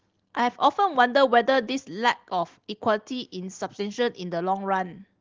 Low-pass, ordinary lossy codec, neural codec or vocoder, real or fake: 7.2 kHz; Opus, 16 kbps; none; real